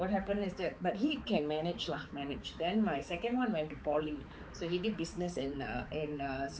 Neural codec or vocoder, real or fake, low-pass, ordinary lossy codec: codec, 16 kHz, 4 kbps, X-Codec, HuBERT features, trained on balanced general audio; fake; none; none